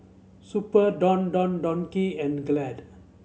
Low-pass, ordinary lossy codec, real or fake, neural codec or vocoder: none; none; real; none